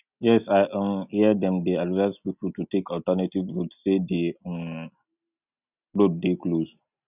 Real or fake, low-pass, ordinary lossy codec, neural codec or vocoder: real; 3.6 kHz; none; none